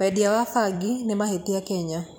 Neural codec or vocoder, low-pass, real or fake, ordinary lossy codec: vocoder, 44.1 kHz, 128 mel bands every 256 samples, BigVGAN v2; none; fake; none